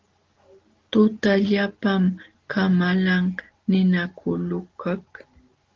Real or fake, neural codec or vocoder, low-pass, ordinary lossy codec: real; none; 7.2 kHz; Opus, 16 kbps